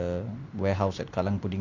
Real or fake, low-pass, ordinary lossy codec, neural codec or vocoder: real; 7.2 kHz; Opus, 64 kbps; none